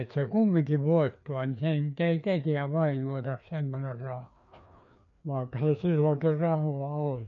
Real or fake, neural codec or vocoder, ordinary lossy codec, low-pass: fake; codec, 16 kHz, 2 kbps, FreqCodec, larger model; none; 7.2 kHz